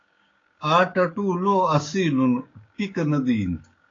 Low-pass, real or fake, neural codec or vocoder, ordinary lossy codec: 7.2 kHz; fake; codec, 16 kHz, 6 kbps, DAC; AAC, 32 kbps